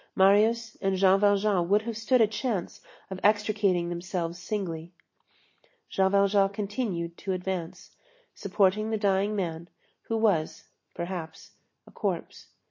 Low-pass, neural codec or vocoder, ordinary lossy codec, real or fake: 7.2 kHz; none; MP3, 32 kbps; real